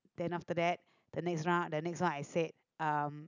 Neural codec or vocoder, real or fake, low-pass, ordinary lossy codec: none; real; 7.2 kHz; none